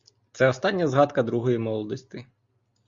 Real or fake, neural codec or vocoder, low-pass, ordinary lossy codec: real; none; 7.2 kHz; Opus, 64 kbps